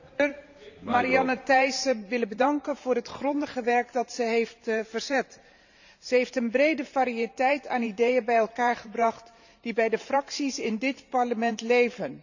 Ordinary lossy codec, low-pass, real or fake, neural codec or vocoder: none; 7.2 kHz; fake; vocoder, 44.1 kHz, 128 mel bands every 512 samples, BigVGAN v2